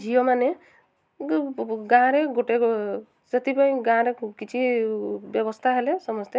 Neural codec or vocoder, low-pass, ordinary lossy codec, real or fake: none; none; none; real